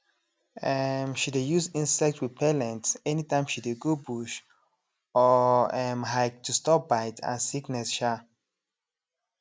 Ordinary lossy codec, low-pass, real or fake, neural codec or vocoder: none; none; real; none